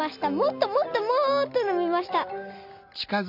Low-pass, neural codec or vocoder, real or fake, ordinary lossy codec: 5.4 kHz; none; real; MP3, 48 kbps